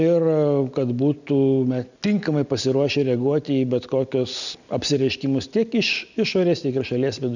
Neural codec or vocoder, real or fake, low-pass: none; real; 7.2 kHz